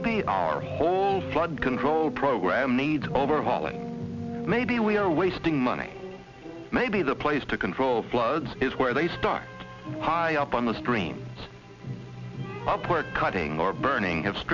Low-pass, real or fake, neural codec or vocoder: 7.2 kHz; real; none